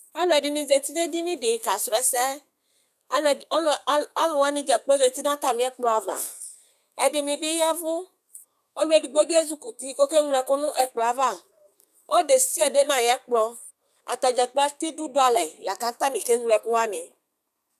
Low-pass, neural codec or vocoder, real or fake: 14.4 kHz; codec, 32 kHz, 1.9 kbps, SNAC; fake